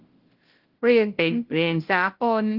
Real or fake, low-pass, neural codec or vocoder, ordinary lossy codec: fake; 5.4 kHz; codec, 16 kHz, 0.5 kbps, FunCodec, trained on Chinese and English, 25 frames a second; Opus, 16 kbps